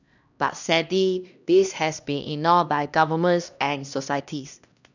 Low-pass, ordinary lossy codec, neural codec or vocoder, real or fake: 7.2 kHz; none; codec, 16 kHz, 1 kbps, X-Codec, HuBERT features, trained on LibriSpeech; fake